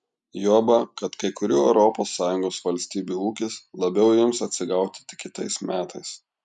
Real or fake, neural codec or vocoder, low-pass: real; none; 10.8 kHz